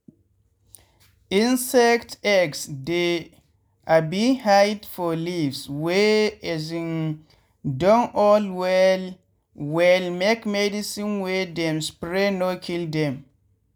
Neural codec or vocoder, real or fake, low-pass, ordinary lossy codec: none; real; none; none